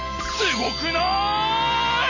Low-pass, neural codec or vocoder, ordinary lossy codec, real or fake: 7.2 kHz; none; none; real